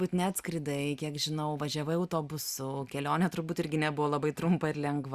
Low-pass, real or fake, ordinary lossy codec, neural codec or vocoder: 14.4 kHz; real; Opus, 64 kbps; none